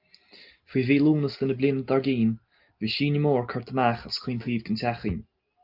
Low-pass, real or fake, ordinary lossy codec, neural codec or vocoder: 5.4 kHz; real; Opus, 24 kbps; none